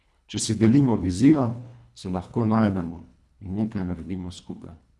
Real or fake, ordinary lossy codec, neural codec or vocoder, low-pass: fake; none; codec, 24 kHz, 1.5 kbps, HILCodec; none